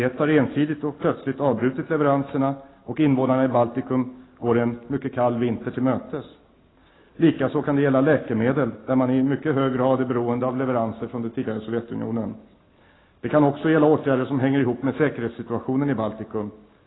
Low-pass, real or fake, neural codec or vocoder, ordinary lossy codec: 7.2 kHz; real; none; AAC, 16 kbps